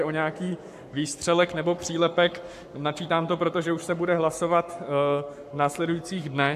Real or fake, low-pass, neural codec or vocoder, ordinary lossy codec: fake; 14.4 kHz; codec, 44.1 kHz, 7.8 kbps, Pupu-Codec; AAC, 96 kbps